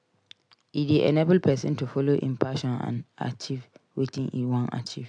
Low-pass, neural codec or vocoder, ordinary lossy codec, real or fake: 9.9 kHz; none; AAC, 64 kbps; real